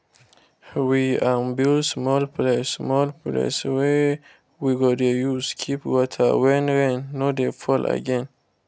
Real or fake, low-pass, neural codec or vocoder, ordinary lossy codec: real; none; none; none